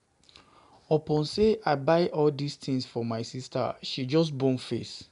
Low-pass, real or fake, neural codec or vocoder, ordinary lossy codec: 10.8 kHz; real; none; none